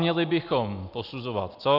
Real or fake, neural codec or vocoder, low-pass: real; none; 5.4 kHz